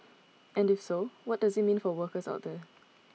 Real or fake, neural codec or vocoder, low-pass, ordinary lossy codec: real; none; none; none